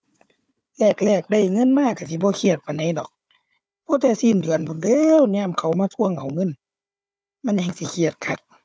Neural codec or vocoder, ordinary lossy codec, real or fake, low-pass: codec, 16 kHz, 4 kbps, FunCodec, trained on Chinese and English, 50 frames a second; none; fake; none